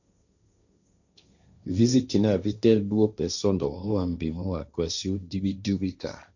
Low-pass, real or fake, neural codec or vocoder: 7.2 kHz; fake; codec, 16 kHz, 1.1 kbps, Voila-Tokenizer